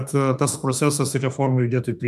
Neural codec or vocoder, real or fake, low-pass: autoencoder, 48 kHz, 32 numbers a frame, DAC-VAE, trained on Japanese speech; fake; 14.4 kHz